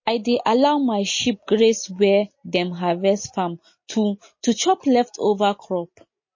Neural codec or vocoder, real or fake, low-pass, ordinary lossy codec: none; real; 7.2 kHz; MP3, 32 kbps